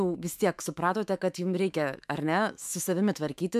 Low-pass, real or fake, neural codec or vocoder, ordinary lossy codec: 14.4 kHz; fake; autoencoder, 48 kHz, 128 numbers a frame, DAC-VAE, trained on Japanese speech; MP3, 96 kbps